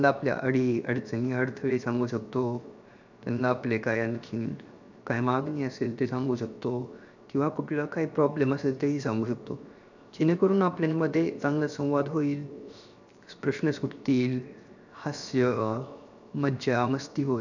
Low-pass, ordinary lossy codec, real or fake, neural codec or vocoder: 7.2 kHz; none; fake; codec, 16 kHz, 0.7 kbps, FocalCodec